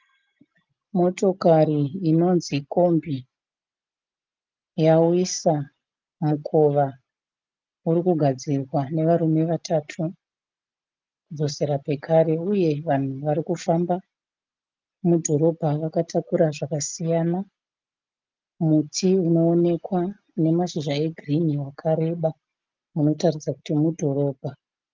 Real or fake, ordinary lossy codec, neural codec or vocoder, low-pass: real; Opus, 32 kbps; none; 7.2 kHz